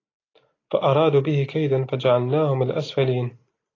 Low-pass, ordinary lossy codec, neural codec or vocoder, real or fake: 7.2 kHz; AAC, 32 kbps; none; real